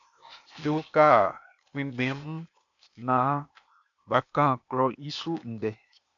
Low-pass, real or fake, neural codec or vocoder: 7.2 kHz; fake; codec, 16 kHz, 0.8 kbps, ZipCodec